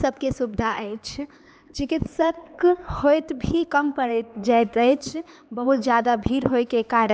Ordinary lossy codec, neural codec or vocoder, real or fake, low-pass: none; codec, 16 kHz, 4 kbps, X-Codec, HuBERT features, trained on LibriSpeech; fake; none